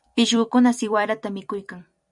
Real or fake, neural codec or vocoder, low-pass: fake; vocoder, 44.1 kHz, 128 mel bands every 256 samples, BigVGAN v2; 10.8 kHz